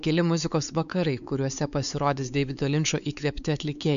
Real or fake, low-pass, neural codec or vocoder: fake; 7.2 kHz; codec, 16 kHz, 4 kbps, X-Codec, WavLM features, trained on Multilingual LibriSpeech